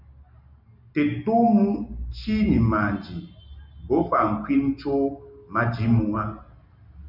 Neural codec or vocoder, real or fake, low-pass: none; real; 5.4 kHz